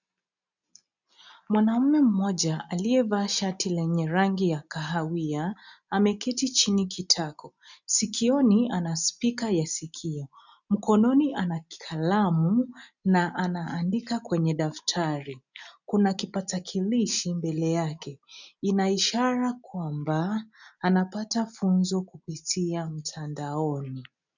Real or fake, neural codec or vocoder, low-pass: real; none; 7.2 kHz